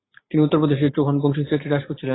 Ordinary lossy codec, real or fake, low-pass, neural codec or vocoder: AAC, 16 kbps; real; 7.2 kHz; none